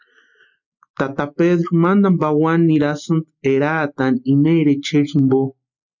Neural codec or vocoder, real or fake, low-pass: none; real; 7.2 kHz